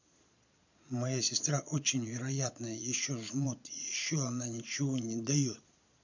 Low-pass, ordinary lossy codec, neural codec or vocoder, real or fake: 7.2 kHz; none; vocoder, 22.05 kHz, 80 mel bands, Vocos; fake